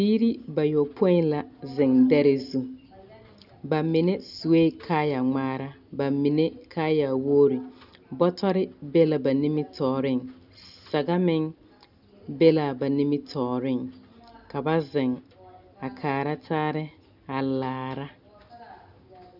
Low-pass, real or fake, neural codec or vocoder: 5.4 kHz; real; none